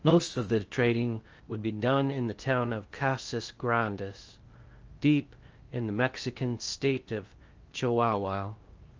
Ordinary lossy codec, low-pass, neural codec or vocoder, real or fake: Opus, 24 kbps; 7.2 kHz; codec, 16 kHz in and 24 kHz out, 0.6 kbps, FocalCodec, streaming, 4096 codes; fake